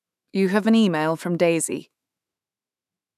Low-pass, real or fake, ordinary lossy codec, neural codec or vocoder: 14.4 kHz; fake; none; autoencoder, 48 kHz, 128 numbers a frame, DAC-VAE, trained on Japanese speech